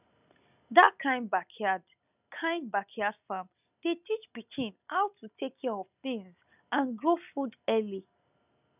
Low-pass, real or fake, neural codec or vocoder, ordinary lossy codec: 3.6 kHz; real; none; none